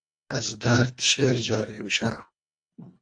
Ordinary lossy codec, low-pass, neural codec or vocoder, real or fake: AAC, 64 kbps; 9.9 kHz; codec, 24 kHz, 1.5 kbps, HILCodec; fake